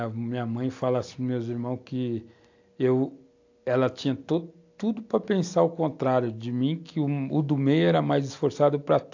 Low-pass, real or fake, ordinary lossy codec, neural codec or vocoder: 7.2 kHz; real; AAC, 48 kbps; none